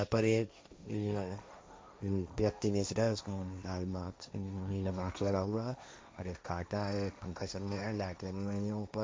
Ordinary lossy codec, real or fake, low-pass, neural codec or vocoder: none; fake; none; codec, 16 kHz, 1.1 kbps, Voila-Tokenizer